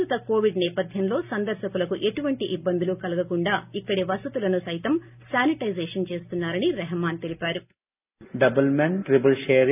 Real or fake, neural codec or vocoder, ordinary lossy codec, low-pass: real; none; none; 3.6 kHz